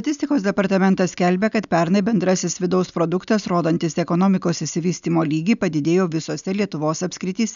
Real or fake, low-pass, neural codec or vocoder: real; 7.2 kHz; none